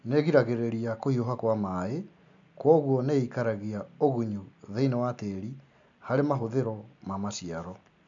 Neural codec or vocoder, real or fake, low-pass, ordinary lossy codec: none; real; 7.2 kHz; MP3, 64 kbps